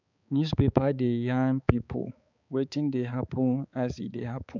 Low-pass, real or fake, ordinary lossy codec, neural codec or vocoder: 7.2 kHz; fake; none; codec, 16 kHz, 4 kbps, X-Codec, HuBERT features, trained on balanced general audio